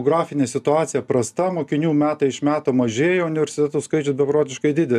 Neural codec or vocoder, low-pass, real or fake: none; 14.4 kHz; real